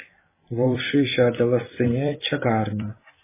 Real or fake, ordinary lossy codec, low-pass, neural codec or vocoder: fake; MP3, 16 kbps; 3.6 kHz; vocoder, 44.1 kHz, 128 mel bands every 256 samples, BigVGAN v2